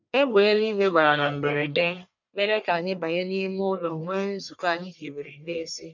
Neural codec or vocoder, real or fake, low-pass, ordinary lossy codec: codec, 44.1 kHz, 1.7 kbps, Pupu-Codec; fake; 7.2 kHz; none